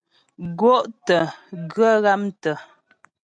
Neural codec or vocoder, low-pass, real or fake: none; 9.9 kHz; real